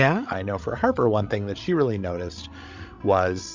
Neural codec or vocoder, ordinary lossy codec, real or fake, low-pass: codec, 16 kHz, 16 kbps, FreqCodec, larger model; MP3, 64 kbps; fake; 7.2 kHz